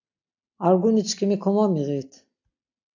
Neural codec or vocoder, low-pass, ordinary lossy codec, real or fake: none; 7.2 kHz; AAC, 48 kbps; real